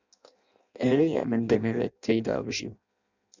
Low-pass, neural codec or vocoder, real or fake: 7.2 kHz; codec, 16 kHz in and 24 kHz out, 0.6 kbps, FireRedTTS-2 codec; fake